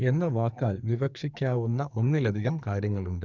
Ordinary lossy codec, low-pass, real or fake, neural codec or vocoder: none; 7.2 kHz; fake; codec, 44.1 kHz, 2.6 kbps, SNAC